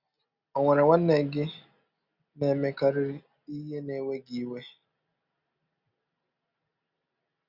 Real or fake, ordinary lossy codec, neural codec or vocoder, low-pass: real; Opus, 64 kbps; none; 5.4 kHz